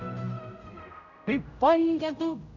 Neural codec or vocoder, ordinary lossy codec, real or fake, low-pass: codec, 16 kHz, 0.5 kbps, X-Codec, HuBERT features, trained on general audio; none; fake; 7.2 kHz